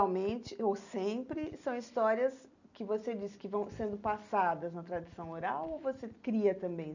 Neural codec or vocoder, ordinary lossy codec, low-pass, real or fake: none; none; 7.2 kHz; real